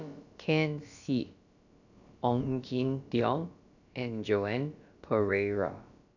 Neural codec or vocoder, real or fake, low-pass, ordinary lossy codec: codec, 16 kHz, about 1 kbps, DyCAST, with the encoder's durations; fake; 7.2 kHz; none